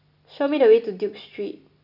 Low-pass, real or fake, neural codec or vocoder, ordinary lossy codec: 5.4 kHz; real; none; none